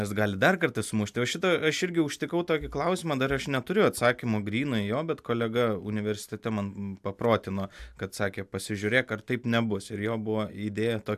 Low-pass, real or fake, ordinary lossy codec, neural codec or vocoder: 14.4 kHz; real; AAC, 96 kbps; none